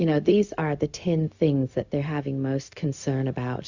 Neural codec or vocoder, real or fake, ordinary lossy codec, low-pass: codec, 16 kHz, 0.4 kbps, LongCat-Audio-Codec; fake; Opus, 64 kbps; 7.2 kHz